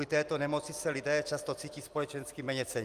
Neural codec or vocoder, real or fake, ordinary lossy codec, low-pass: vocoder, 44.1 kHz, 128 mel bands every 256 samples, BigVGAN v2; fake; Opus, 32 kbps; 14.4 kHz